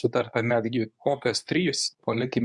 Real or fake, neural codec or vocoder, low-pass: fake; codec, 24 kHz, 0.9 kbps, WavTokenizer, medium speech release version 2; 10.8 kHz